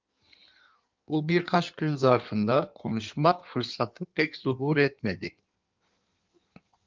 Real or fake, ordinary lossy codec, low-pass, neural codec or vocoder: fake; Opus, 32 kbps; 7.2 kHz; codec, 16 kHz in and 24 kHz out, 1.1 kbps, FireRedTTS-2 codec